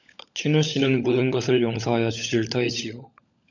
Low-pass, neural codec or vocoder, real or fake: 7.2 kHz; codec, 16 kHz, 16 kbps, FunCodec, trained on LibriTTS, 50 frames a second; fake